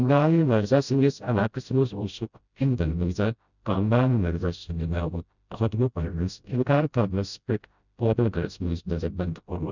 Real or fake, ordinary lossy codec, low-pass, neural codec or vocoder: fake; none; 7.2 kHz; codec, 16 kHz, 0.5 kbps, FreqCodec, smaller model